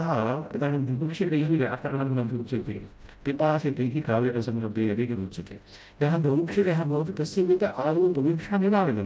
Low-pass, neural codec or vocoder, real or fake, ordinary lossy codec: none; codec, 16 kHz, 0.5 kbps, FreqCodec, smaller model; fake; none